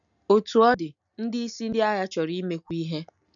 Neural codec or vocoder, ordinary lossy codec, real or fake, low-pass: none; none; real; 7.2 kHz